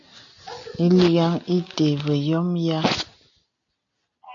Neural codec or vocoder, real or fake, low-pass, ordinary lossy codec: none; real; 7.2 kHz; AAC, 64 kbps